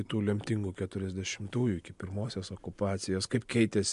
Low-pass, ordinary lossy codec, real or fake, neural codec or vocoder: 10.8 kHz; MP3, 64 kbps; real; none